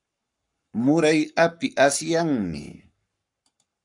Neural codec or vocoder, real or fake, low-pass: codec, 44.1 kHz, 7.8 kbps, Pupu-Codec; fake; 10.8 kHz